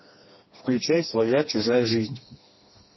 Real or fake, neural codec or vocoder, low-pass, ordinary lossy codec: fake; codec, 16 kHz, 2 kbps, FreqCodec, smaller model; 7.2 kHz; MP3, 24 kbps